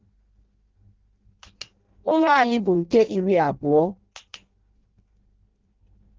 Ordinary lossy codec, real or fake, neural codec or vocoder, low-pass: Opus, 16 kbps; fake; codec, 16 kHz in and 24 kHz out, 0.6 kbps, FireRedTTS-2 codec; 7.2 kHz